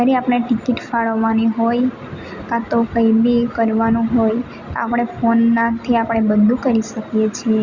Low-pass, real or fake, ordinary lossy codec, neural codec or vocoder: 7.2 kHz; real; Opus, 64 kbps; none